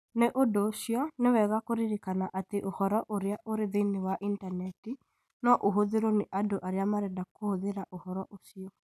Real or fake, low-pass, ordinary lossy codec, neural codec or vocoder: real; 14.4 kHz; none; none